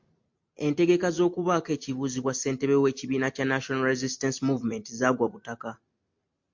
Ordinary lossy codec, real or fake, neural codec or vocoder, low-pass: MP3, 48 kbps; real; none; 7.2 kHz